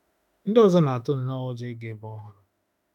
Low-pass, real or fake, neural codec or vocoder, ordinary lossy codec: 19.8 kHz; fake; autoencoder, 48 kHz, 32 numbers a frame, DAC-VAE, trained on Japanese speech; none